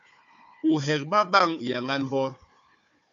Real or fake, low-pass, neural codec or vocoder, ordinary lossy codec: fake; 7.2 kHz; codec, 16 kHz, 4 kbps, FunCodec, trained on Chinese and English, 50 frames a second; AAC, 64 kbps